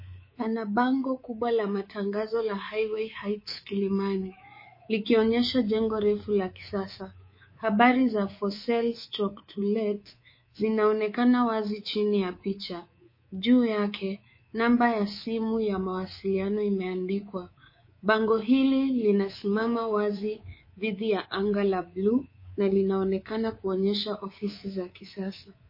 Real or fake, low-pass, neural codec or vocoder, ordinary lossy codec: fake; 5.4 kHz; codec, 24 kHz, 3.1 kbps, DualCodec; MP3, 24 kbps